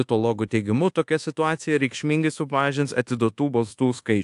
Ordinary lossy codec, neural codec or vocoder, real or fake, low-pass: AAC, 64 kbps; codec, 24 kHz, 1.2 kbps, DualCodec; fake; 10.8 kHz